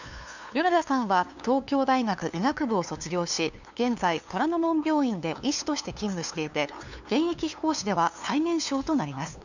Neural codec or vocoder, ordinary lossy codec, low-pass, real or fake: codec, 16 kHz, 2 kbps, FunCodec, trained on LibriTTS, 25 frames a second; none; 7.2 kHz; fake